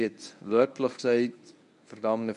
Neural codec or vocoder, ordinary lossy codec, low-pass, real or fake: codec, 24 kHz, 0.9 kbps, WavTokenizer, medium speech release version 1; none; 10.8 kHz; fake